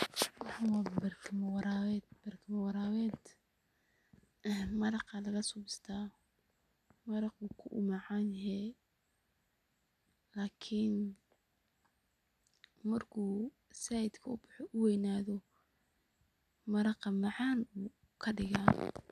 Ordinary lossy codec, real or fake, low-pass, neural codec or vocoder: none; real; 14.4 kHz; none